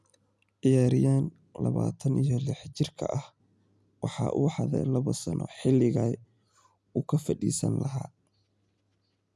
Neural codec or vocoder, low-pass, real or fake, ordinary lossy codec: none; none; real; none